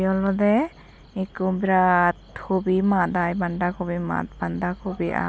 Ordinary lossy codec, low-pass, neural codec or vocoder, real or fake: none; none; none; real